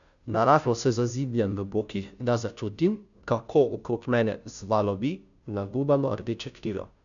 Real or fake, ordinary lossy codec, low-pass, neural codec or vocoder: fake; none; 7.2 kHz; codec, 16 kHz, 0.5 kbps, FunCodec, trained on Chinese and English, 25 frames a second